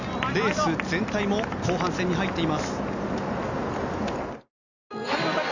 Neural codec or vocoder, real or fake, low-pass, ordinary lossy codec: none; real; 7.2 kHz; none